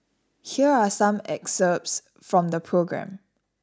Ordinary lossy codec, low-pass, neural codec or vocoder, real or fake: none; none; none; real